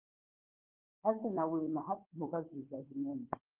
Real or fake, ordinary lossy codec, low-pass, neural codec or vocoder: fake; Opus, 32 kbps; 3.6 kHz; codec, 16 kHz, 4 kbps, FunCodec, trained on Chinese and English, 50 frames a second